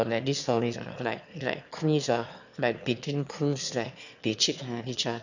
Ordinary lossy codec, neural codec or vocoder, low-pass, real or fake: none; autoencoder, 22.05 kHz, a latent of 192 numbers a frame, VITS, trained on one speaker; 7.2 kHz; fake